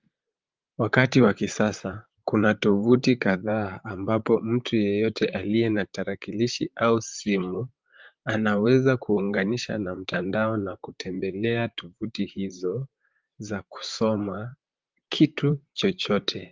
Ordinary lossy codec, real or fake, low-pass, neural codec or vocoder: Opus, 24 kbps; fake; 7.2 kHz; vocoder, 44.1 kHz, 128 mel bands, Pupu-Vocoder